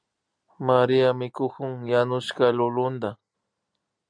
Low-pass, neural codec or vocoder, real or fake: 9.9 kHz; none; real